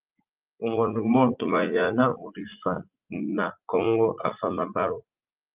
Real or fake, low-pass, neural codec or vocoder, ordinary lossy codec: fake; 3.6 kHz; vocoder, 44.1 kHz, 80 mel bands, Vocos; Opus, 24 kbps